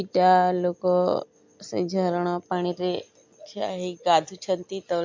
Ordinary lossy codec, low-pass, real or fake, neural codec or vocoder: MP3, 48 kbps; 7.2 kHz; real; none